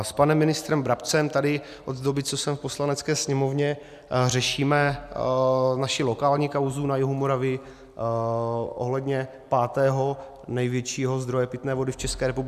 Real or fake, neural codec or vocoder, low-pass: real; none; 14.4 kHz